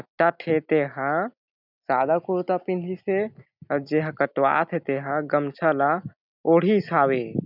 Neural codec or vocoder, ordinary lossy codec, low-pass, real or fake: none; none; 5.4 kHz; real